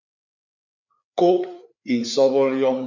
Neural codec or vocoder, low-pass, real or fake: codec, 16 kHz, 4 kbps, FreqCodec, larger model; 7.2 kHz; fake